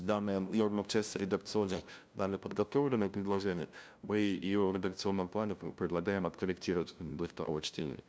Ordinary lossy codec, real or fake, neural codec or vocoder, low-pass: none; fake; codec, 16 kHz, 0.5 kbps, FunCodec, trained on LibriTTS, 25 frames a second; none